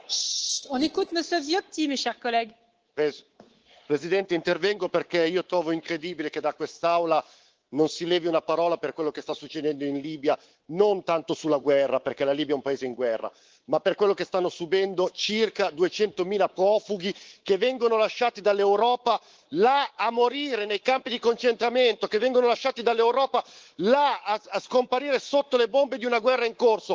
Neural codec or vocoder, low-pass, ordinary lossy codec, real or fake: codec, 24 kHz, 3.1 kbps, DualCodec; 7.2 kHz; Opus, 16 kbps; fake